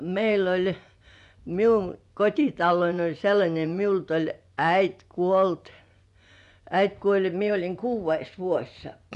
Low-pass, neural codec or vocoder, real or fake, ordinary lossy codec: 10.8 kHz; vocoder, 24 kHz, 100 mel bands, Vocos; fake; none